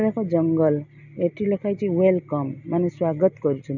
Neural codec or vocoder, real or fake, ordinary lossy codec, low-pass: none; real; none; 7.2 kHz